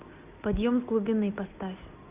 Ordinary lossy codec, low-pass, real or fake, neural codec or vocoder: Opus, 64 kbps; 3.6 kHz; real; none